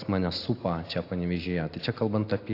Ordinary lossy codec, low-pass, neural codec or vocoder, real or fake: AAC, 32 kbps; 5.4 kHz; none; real